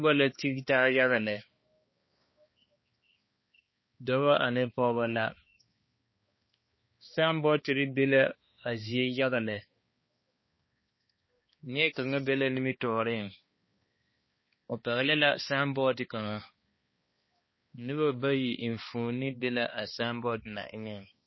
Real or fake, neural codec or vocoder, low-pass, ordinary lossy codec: fake; codec, 16 kHz, 2 kbps, X-Codec, HuBERT features, trained on balanced general audio; 7.2 kHz; MP3, 24 kbps